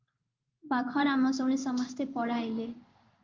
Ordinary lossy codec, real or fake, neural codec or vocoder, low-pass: Opus, 32 kbps; fake; codec, 16 kHz in and 24 kHz out, 1 kbps, XY-Tokenizer; 7.2 kHz